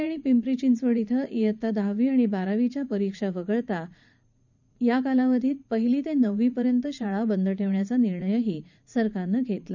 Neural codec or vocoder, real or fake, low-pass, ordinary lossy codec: vocoder, 44.1 kHz, 80 mel bands, Vocos; fake; 7.2 kHz; none